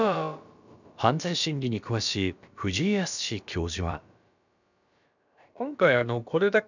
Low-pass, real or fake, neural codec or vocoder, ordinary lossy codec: 7.2 kHz; fake; codec, 16 kHz, about 1 kbps, DyCAST, with the encoder's durations; none